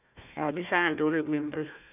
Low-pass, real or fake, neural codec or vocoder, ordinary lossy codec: 3.6 kHz; fake; codec, 16 kHz, 1 kbps, FunCodec, trained on Chinese and English, 50 frames a second; none